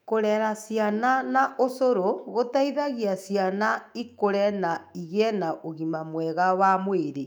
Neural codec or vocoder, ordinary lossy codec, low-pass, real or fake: autoencoder, 48 kHz, 128 numbers a frame, DAC-VAE, trained on Japanese speech; none; 19.8 kHz; fake